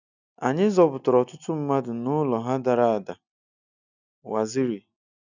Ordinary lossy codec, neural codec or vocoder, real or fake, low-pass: none; none; real; 7.2 kHz